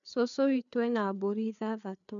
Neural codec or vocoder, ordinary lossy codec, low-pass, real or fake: codec, 16 kHz, 4 kbps, FreqCodec, larger model; none; 7.2 kHz; fake